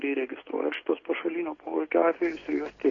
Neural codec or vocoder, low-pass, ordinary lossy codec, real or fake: none; 7.2 kHz; AAC, 32 kbps; real